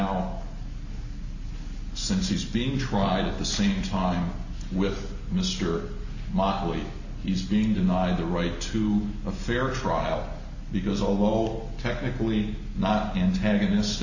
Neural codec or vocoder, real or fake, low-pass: none; real; 7.2 kHz